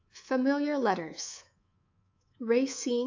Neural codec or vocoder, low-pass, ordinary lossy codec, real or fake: codec, 24 kHz, 3.1 kbps, DualCodec; 7.2 kHz; AAC, 48 kbps; fake